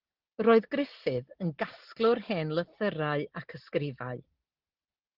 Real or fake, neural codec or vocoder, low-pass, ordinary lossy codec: real; none; 5.4 kHz; Opus, 16 kbps